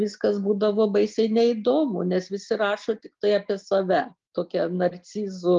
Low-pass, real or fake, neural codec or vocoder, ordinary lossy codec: 7.2 kHz; real; none; Opus, 32 kbps